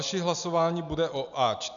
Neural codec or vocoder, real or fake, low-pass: none; real; 7.2 kHz